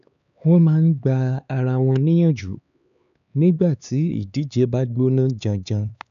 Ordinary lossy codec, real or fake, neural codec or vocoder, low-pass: none; fake; codec, 16 kHz, 4 kbps, X-Codec, HuBERT features, trained on LibriSpeech; 7.2 kHz